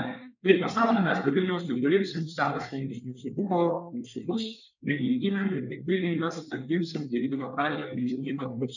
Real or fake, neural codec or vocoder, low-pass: fake; codec, 24 kHz, 1 kbps, SNAC; 7.2 kHz